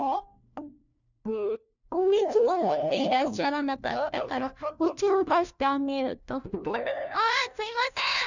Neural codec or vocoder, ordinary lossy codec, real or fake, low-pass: codec, 16 kHz, 1 kbps, FunCodec, trained on LibriTTS, 50 frames a second; none; fake; 7.2 kHz